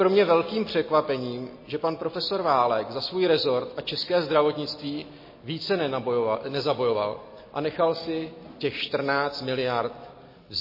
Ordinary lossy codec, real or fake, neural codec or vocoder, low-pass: MP3, 24 kbps; real; none; 5.4 kHz